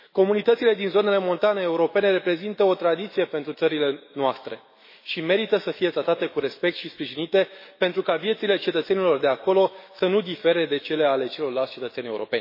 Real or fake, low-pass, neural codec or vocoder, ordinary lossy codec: fake; 5.4 kHz; autoencoder, 48 kHz, 128 numbers a frame, DAC-VAE, trained on Japanese speech; MP3, 24 kbps